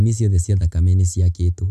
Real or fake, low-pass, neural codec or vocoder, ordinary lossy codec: real; 14.4 kHz; none; none